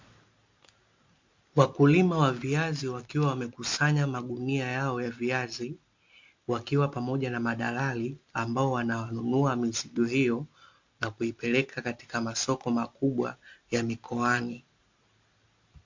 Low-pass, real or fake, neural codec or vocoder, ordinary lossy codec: 7.2 kHz; real; none; MP3, 48 kbps